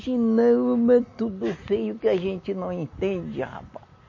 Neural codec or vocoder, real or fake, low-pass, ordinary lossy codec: none; real; 7.2 kHz; MP3, 32 kbps